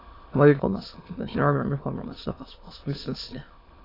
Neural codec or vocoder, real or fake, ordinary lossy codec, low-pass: autoencoder, 22.05 kHz, a latent of 192 numbers a frame, VITS, trained on many speakers; fake; AAC, 24 kbps; 5.4 kHz